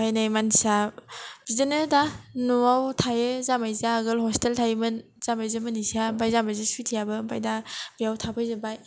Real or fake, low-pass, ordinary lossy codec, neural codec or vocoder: real; none; none; none